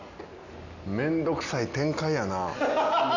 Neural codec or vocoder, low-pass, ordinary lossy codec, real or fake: none; 7.2 kHz; none; real